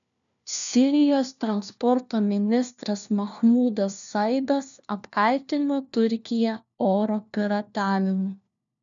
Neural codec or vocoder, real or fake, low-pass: codec, 16 kHz, 1 kbps, FunCodec, trained on LibriTTS, 50 frames a second; fake; 7.2 kHz